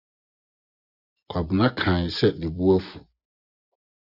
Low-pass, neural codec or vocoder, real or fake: 5.4 kHz; vocoder, 24 kHz, 100 mel bands, Vocos; fake